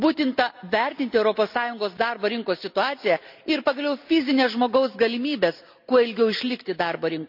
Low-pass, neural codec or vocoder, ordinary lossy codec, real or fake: 5.4 kHz; none; none; real